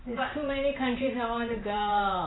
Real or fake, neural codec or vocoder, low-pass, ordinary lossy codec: fake; vocoder, 44.1 kHz, 128 mel bands every 512 samples, BigVGAN v2; 7.2 kHz; AAC, 16 kbps